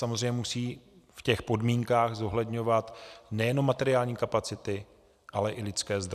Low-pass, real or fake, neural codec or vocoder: 14.4 kHz; fake; vocoder, 44.1 kHz, 128 mel bands every 256 samples, BigVGAN v2